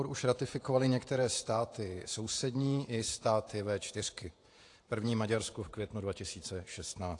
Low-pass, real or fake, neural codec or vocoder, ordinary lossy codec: 10.8 kHz; fake; vocoder, 48 kHz, 128 mel bands, Vocos; AAC, 48 kbps